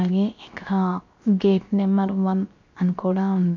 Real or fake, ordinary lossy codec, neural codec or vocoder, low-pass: fake; MP3, 48 kbps; codec, 16 kHz, 0.7 kbps, FocalCodec; 7.2 kHz